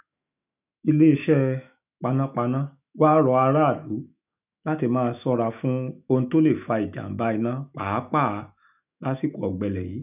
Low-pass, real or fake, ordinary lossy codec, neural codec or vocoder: 3.6 kHz; fake; none; autoencoder, 48 kHz, 128 numbers a frame, DAC-VAE, trained on Japanese speech